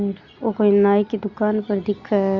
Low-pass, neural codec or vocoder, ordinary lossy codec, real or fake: 7.2 kHz; none; Opus, 64 kbps; real